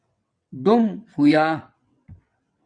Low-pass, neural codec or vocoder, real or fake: 9.9 kHz; vocoder, 22.05 kHz, 80 mel bands, WaveNeXt; fake